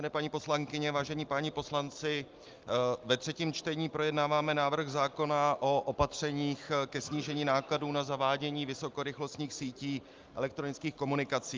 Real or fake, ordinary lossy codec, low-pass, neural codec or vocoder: real; Opus, 24 kbps; 7.2 kHz; none